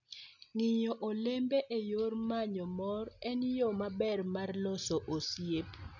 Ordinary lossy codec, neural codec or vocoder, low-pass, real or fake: none; none; 7.2 kHz; real